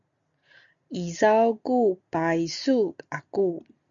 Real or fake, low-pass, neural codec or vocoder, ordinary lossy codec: real; 7.2 kHz; none; MP3, 96 kbps